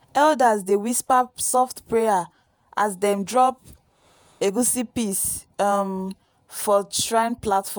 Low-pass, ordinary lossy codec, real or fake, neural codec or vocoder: none; none; fake; vocoder, 48 kHz, 128 mel bands, Vocos